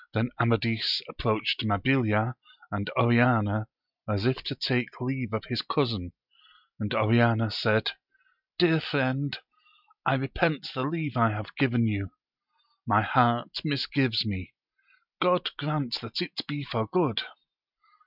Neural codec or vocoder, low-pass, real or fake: none; 5.4 kHz; real